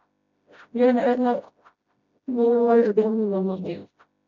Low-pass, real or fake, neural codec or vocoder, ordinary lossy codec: 7.2 kHz; fake; codec, 16 kHz, 0.5 kbps, FreqCodec, smaller model; MP3, 64 kbps